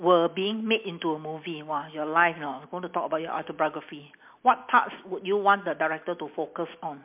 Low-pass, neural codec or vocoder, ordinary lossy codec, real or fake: 3.6 kHz; none; MP3, 32 kbps; real